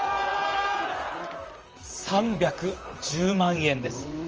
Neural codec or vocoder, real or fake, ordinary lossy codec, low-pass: vocoder, 44.1 kHz, 128 mel bands, Pupu-Vocoder; fake; Opus, 24 kbps; 7.2 kHz